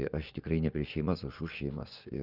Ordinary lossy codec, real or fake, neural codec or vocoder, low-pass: Opus, 24 kbps; fake; vocoder, 24 kHz, 100 mel bands, Vocos; 5.4 kHz